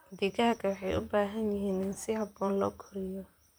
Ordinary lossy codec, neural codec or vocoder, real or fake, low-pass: none; vocoder, 44.1 kHz, 128 mel bands, Pupu-Vocoder; fake; none